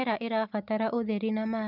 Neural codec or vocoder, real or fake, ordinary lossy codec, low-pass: none; real; none; 5.4 kHz